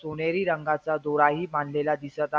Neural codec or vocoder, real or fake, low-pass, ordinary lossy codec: none; real; none; none